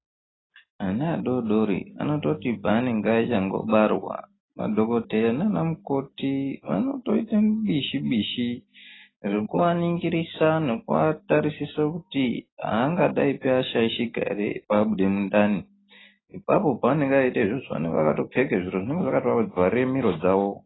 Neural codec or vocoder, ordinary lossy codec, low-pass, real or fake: none; AAC, 16 kbps; 7.2 kHz; real